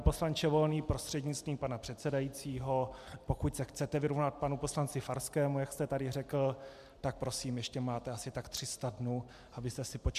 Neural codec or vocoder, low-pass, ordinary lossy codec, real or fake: none; 14.4 kHz; MP3, 96 kbps; real